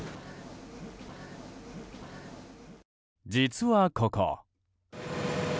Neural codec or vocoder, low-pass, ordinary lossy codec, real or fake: none; none; none; real